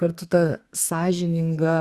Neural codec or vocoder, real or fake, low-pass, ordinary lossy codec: codec, 32 kHz, 1.9 kbps, SNAC; fake; 14.4 kHz; Opus, 64 kbps